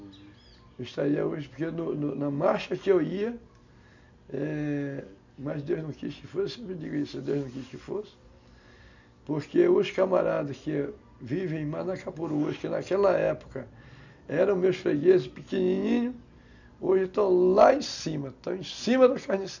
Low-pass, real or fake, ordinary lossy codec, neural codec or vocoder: 7.2 kHz; real; none; none